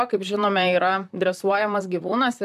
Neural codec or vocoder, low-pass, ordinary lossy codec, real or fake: vocoder, 44.1 kHz, 128 mel bands, Pupu-Vocoder; 14.4 kHz; MP3, 96 kbps; fake